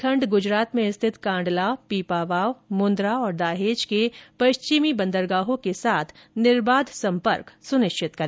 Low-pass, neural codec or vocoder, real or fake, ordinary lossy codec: none; none; real; none